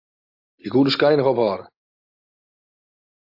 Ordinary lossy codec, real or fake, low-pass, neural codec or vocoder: AAC, 48 kbps; real; 5.4 kHz; none